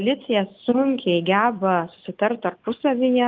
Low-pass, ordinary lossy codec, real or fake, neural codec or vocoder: 7.2 kHz; Opus, 24 kbps; real; none